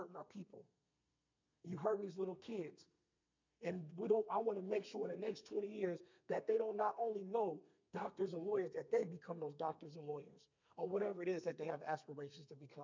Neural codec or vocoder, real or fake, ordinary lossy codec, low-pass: codec, 32 kHz, 1.9 kbps, SNAC; fake; MP3, 64 kbps; 7.2 kHz